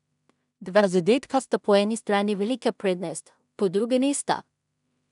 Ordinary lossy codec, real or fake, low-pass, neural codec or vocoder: none; fake; 10.8 kHz; codec, 16 kHz in and 24 kHz out, 0.4 kbps, LongCat-Audio-Codec, two codebook decoder